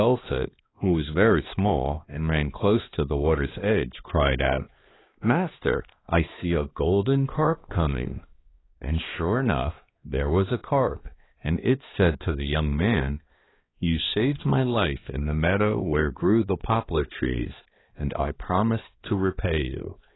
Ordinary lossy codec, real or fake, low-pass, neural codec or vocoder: AAC, 16 kbps; fake; 7.2 kHz; codec, 16 kHz, 2 kbps, X-Codec, HuBERT features, trained on balanced general audio